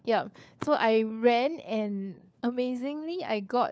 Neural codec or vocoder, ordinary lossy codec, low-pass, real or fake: codec, 16 kHz, 4 kbps, FunCodec, trained on LibriTTS, 50 frames a second; none; none; fake